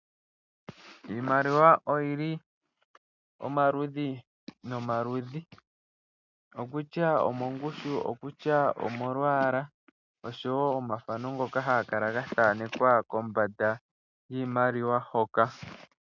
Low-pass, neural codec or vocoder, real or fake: 7.2 kHz; none; real